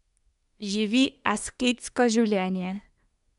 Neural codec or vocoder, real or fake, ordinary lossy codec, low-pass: codec, 24 kHz, 1 kbps, SNAC; fake; none; 10.8 kHz